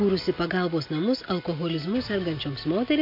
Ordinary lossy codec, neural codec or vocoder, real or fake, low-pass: MP3, 32 kbps; none; real; 5.4 kHz